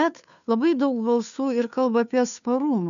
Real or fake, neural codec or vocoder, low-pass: fake; codec, 16 kHz, 2 kbps, FunCodec, trained on Chinese and English, 25 frames a second; 7.2 kHz